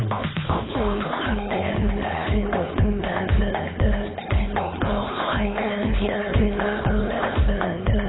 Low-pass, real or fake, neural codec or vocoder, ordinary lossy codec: 7.2 kHz; fake; codec, 16 kHz, 4.8 kbps, FACodec; AAC, 16 kbps